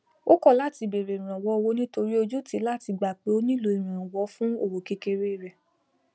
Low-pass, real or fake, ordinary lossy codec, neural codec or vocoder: none; real; none; none